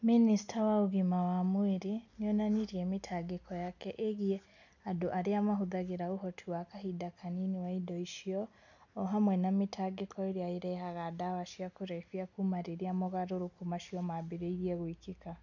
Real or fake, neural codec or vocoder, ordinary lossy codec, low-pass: real; none; none; 7.2 kHz